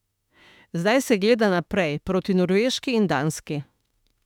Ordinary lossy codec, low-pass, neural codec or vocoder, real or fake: none; 19.8 kHz; autoencoder, 48 kHz, 32 numbers a frame, DAC-VAE, trained on Japanese speech; fake